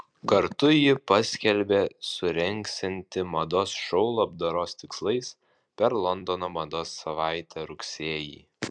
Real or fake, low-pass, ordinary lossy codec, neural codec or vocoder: fake; 9.9 kHz; MP3, 96 kbps; vocoder, 44.1 kHz, 128 mel bands every 256 samples, BigVGAN v2